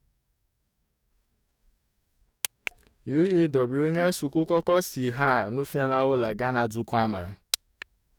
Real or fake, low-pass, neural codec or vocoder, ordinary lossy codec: fake; 19.8 kHz; codec, 44.1 kHz, 2.6 kbps, DAC; none